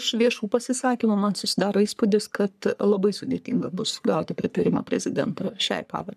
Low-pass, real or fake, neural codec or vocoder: 14.4 kHz; fake; codec, 44.1 kHz, 3.4 kbps, Pupu-Codec